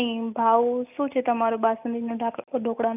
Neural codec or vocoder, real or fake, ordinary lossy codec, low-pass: none; real; none; 3.6 kHz